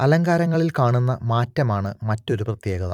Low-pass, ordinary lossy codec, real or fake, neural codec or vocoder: 19.8 kHz; none; fake; vocoder, 44.1 kHz, 128 mel bands every 256 samples, BigVGAN v2